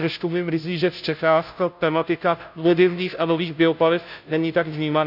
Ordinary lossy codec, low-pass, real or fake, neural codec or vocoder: none; 5.4 kHz; fake; codec, 16 kHz, 0.5 kbps, FunCodec, trained on Chinese and English, 25 frames a second